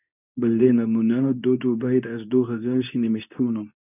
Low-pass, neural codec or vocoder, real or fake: 3.6 kHz; codec, 24 kHz, 0.9 kbps, WavTokenizer, medium speech release version 2; fake